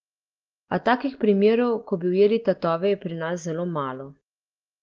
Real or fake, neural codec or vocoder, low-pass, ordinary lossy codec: real; none; 7.2 kHz; Opus, 16 kbps